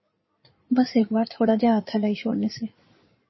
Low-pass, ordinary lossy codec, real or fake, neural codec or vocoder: 7.2 kHz; MP3, 24 kbps; fake; codec, 16 kHz in and 24 kHz out, 2.2 kbps, FireRedTTS-2 codec